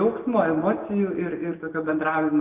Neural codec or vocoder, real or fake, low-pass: none; real; 3.6 kHz